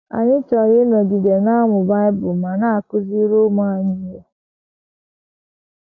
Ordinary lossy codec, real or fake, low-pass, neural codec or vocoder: none; real; 7.2 kHz; none